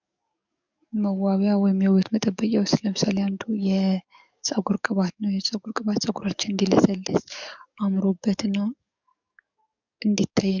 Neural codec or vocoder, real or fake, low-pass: codec, 44.1 kHz, 7.8 kbps, DAC; fake; 7.2 kHz